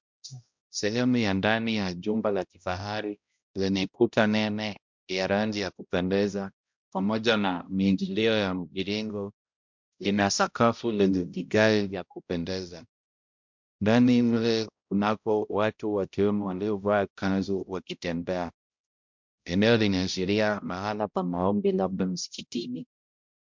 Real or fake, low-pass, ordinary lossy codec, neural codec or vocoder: fake; 7.2 kHz; MP3, 64 kbps; codec, 16 kHz, 0.5 kbps, X-Codec, HuBERT features, trained on balanced general audio